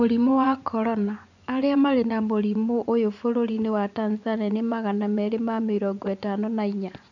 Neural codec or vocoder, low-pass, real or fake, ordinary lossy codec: vocoder, 24 kHz, 100 mel bands, Vocos; 7.2 kHz; fake; none